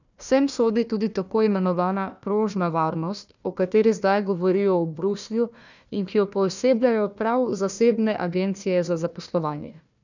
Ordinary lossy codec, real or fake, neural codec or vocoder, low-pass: none; fake; codec, 16 kHz, 1 kbps, FunCodec, trained on Chinese and English, 50 frames a second; 7.2 kHz